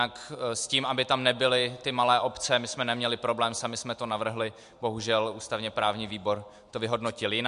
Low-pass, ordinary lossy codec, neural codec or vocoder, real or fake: 10.8 kHz; MP3, 64 kbps; none; real